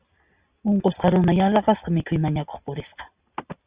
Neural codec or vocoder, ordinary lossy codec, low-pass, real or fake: none; Opus, 64 kbps; 3.6 kHz; real